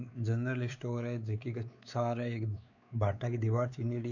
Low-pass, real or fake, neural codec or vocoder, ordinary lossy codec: 7.2 kHz; fake; codec, 16 kHz, 4 kbps, X-Codec, WavLM features, trained on Multilingual LibriSpeech; none